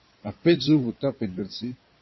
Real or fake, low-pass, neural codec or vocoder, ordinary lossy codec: fake; 7.2 kHz; codec, 16 kHz in and 24 kHz out, 2.2 kbps, FireRedTTS-2 codec; MP3, 24 kbps